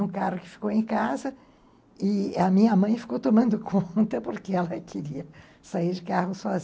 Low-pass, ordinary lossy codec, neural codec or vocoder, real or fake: none; none; none; real